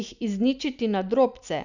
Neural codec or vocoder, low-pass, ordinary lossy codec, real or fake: none; 7.2 kHz; none; real